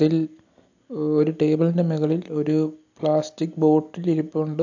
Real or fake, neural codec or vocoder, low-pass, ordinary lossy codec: real; none; 7.2 kHz; AAC, 48 kbps